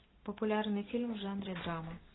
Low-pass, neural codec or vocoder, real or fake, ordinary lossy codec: 7.2 kHz; none; real; AAC, 16 kbps